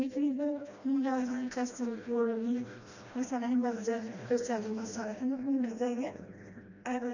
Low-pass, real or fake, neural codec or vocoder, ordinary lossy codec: 7.2 kHz; fake; codec, 16 kHz, 1 kbps, FreqCodec, smaller model; none